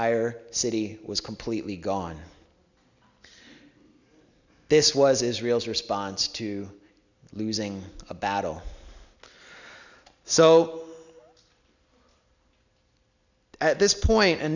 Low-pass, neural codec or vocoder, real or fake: 7.2 kHz; none; real